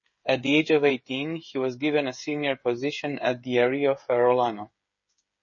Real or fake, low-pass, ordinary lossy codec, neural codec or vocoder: fake; 7.2 kHz; MP3, 32 kbps; codec, 16 kHz, 8 kbps, FreqCodec, smaller model